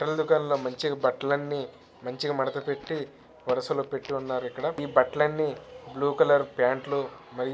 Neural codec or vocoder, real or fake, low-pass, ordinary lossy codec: none; real; none; none